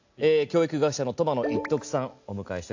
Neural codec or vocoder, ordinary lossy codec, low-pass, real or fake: none; AAC, 48 kbps; 7.2 kHz; real